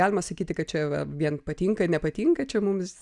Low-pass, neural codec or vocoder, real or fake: 10.8 kHz; none; real